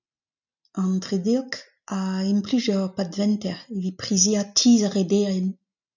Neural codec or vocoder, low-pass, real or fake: none; 7.2 kHz; real